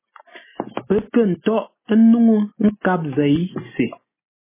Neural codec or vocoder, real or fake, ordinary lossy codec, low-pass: none; real; MP3, 16 kbps; 3.6 kHz